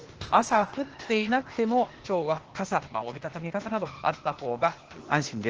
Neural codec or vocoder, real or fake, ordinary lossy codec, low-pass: codec, 16 kHz, 0.8 kbps, ZipCodec; fake; Opus, 16 kbps; 7.2 kHz